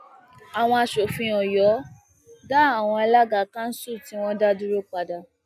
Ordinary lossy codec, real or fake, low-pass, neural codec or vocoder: none; real; 14.4 kHz; none